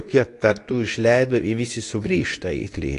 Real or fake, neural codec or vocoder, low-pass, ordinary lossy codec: fake; codec, 24 kHz, 0.9 kbps, WavTokenizer, medium speech release version 2; 10.8 kHz; AAC, 48 kbps